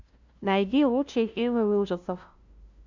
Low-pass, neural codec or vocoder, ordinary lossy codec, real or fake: 7.2 kHz; codec, 16 kHz, 0.5 kbps, FunCodec, trained on LibriTTS, 25 frames a second; Opus, 64 kbps; fake